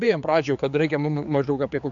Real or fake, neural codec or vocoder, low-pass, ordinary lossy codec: fake; codec, 16 kHz, 4 kbps, X-Codec, HuBERT features, trained on general audio; 7.2 kHz; MP3, 64 kbps